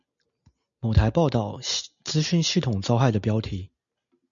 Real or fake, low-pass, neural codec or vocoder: real; 7.2 kHz; none